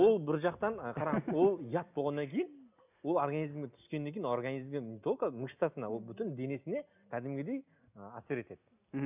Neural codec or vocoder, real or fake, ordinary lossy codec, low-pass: none; real; none; 3.6 kHz